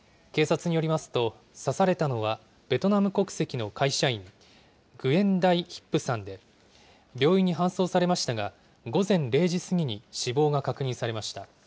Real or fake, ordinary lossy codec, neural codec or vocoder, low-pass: real; none; none; none